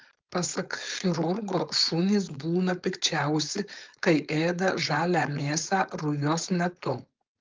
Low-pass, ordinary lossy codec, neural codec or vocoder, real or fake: 7.2 kHz; Opus, 24 kbps; codec, 16 kHz, 4.8 kbps, FACodec; fake